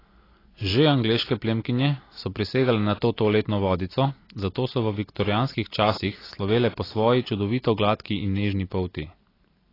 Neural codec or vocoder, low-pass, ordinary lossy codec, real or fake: none; 5.4 kHz; AAC, 24 kbps; real